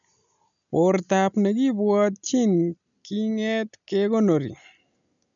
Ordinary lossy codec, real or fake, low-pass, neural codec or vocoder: none; real; 7.2 kHz; none